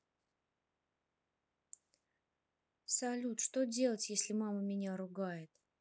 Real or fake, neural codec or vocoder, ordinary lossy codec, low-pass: real; none; none; none